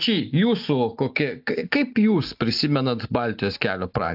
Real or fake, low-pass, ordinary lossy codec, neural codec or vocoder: real; 5.4 kHz; AAC, 48 kbps; none